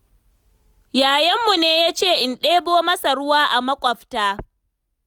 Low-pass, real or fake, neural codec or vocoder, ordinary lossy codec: 19.8 kHz; real; none; none